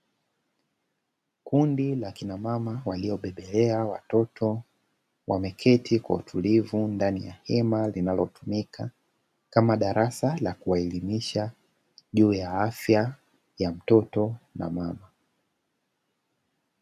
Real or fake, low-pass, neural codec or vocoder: real; 14.4 kHz; none